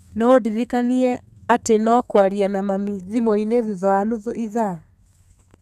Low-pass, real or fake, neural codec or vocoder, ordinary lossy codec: 14.4 kHz; fake; codec, 32 kHz, 1.9 kbps, SNAC; none